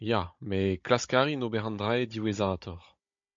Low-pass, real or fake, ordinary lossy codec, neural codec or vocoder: 7.2 kHz; fake; MP3, 48 kbps; codec, 16 kHz, 16 kbps, FunCodec, trained on Chinese and English, 50 frames a second